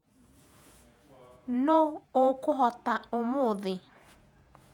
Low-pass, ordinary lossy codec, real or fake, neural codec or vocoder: 19.8 kHz; none; fake; vocoder, 48 kHz, 128 mel bands, Vocos